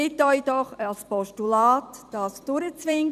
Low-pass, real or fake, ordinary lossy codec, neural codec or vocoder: 14.4 kHz; fake; none; vocoder, 44.1 kHz, 128 mel bands every 256 samples, BigVGAN v2